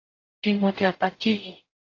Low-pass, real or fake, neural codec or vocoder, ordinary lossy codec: 7.2 kHz; fake; codec, 44.1 kHz, 0.9 kbps, DAC; AAC, 32 kbps